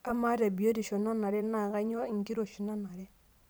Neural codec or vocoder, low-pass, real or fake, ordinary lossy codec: vocoder, 44.1 kHz, 128 mel bands every 512 samples, BigVGAN v2; none; fake; none